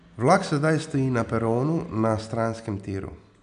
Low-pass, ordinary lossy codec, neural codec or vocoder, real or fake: 9.9 kHz; none; none; real